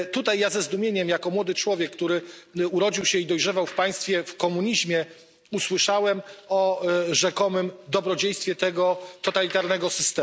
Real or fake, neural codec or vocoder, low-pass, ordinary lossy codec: real; none; none; none